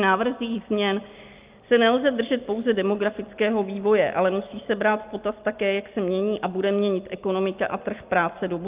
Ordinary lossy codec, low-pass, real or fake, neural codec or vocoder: Opus, 24 kbps; 3.6 kHz; real; none